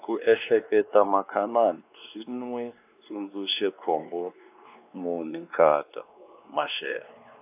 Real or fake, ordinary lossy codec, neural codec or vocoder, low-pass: fake; AAC, 32 kbps; codec, 16 kHz, 2 kbps, X-Codec, WavLM features, trained on Multilingual LibriSpeech; 3.6 kHz